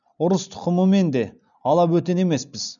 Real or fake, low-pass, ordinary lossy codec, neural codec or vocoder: real; 7.2 kHz; none; none